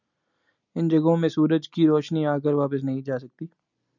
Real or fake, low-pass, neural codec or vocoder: real; 7.2 kHz; none